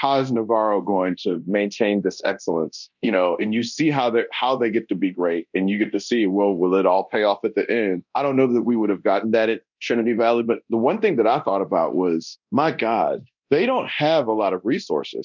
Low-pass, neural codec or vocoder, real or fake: 7.2 kHz; codec, 24 kHz, 0.9 kbps, DualCodec; fake